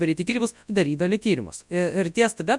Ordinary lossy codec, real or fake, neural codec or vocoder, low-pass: MP3, 96 kbps; fake; codec, 24 kHz, 0.9 kbps, WavTokenizer, large speech release; 10.8 kHz